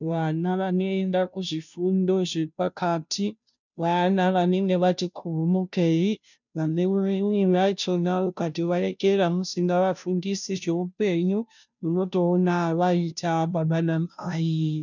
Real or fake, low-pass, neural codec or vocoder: fake; 7.2 kHz; codec, 16 kHz, 0.5 kbps, FunCodec, trained on Chinese and English, 25 frames a second